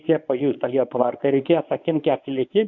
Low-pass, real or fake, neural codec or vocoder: 7.2 kHz; fake; codec, 24 kHz, 0.9 kbps, WavTokenizer, medium speech release version 1